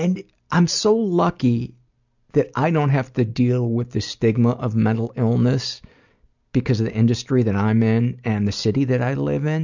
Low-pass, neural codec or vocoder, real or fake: 7.2 kHz; none; real